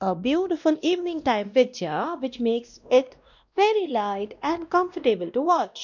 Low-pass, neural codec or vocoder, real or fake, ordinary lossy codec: 7.2 kHz; codec, 16 kHz, 1 kbps, X-Codec, WavLM features, trained on Multilingual LibriSpeech; fake; none